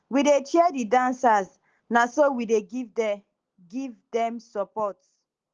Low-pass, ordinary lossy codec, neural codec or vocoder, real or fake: 7.2 kHz; Opus, 16 kbps; none; real